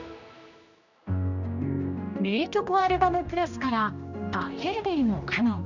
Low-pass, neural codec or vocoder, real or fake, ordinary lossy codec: 7.2 kHz; codec, 16 kHz, 1 kbps, X-Codec, HuBERT features, trained on general audio; fake; none